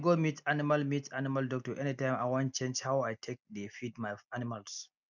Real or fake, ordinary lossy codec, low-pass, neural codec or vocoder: real; none; 7.2 kHz; none